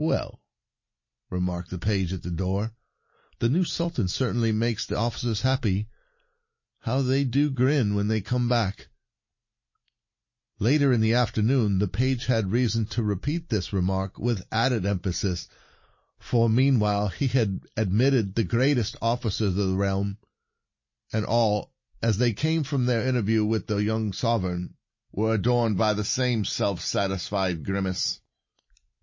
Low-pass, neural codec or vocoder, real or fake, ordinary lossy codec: 7.2 kHz; none; real; MP3, 32 kbps